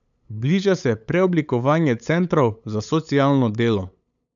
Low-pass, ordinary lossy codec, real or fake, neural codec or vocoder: 7.2 kHz; none; fake; codec, 16 kHz, 8 kbps, FunCodec, trained on LibriTTS, 25 frames a second